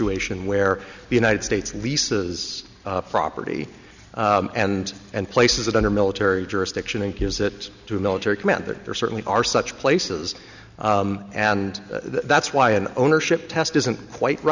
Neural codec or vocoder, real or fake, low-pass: none; real; 7.2 kHz